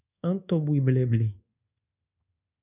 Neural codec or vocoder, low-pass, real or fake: codec, 24 kHz, 1.2 kbps, DualCodec; 3.6 kHz; fake